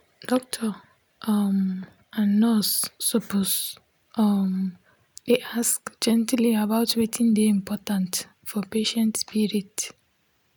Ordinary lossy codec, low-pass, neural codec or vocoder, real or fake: none; none; none; real